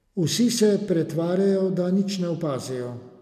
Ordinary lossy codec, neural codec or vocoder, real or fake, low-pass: none; none; real; 14.4 kHz